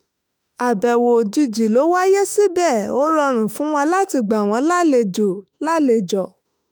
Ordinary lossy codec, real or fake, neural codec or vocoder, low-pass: none; fake; autoencoder, 48 kHz, 32 numbers a frame, DAC-VAE, trained on Japanese speech; none